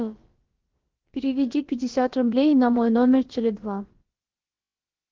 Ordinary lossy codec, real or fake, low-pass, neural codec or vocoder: Opus, 16 kbps; fake; 7.2 kHz; codec, 16 kHz, about 1 kbps, DyCAST, with the encoder's durations